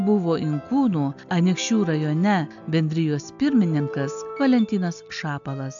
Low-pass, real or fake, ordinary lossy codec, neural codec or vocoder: 7.2 kHz; real; AAC, 64 kbps; none